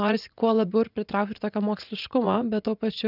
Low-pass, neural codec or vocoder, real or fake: 5.4 kHz; vocoder, 44.1 kHz, 80 mel bands, Vocos; fake